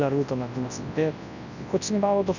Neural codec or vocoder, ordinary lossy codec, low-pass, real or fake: codec, 24 kHz, 0.9 kbps, WavTokenizer, large speech release; none; 7.2 kHz; fake